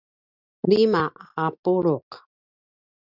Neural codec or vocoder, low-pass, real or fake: none; 5.4 kHz; real